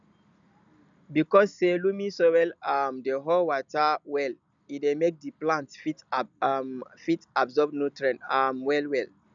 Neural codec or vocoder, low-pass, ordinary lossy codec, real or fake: none; 7.2 kHz; none; real